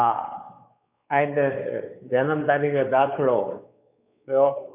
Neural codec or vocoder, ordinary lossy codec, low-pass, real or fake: codec, 16 kHz, 4 kbps, X-Codec, WavLM features, trained on Multilingual LibriSpeech; none; 3.6 kHz; fake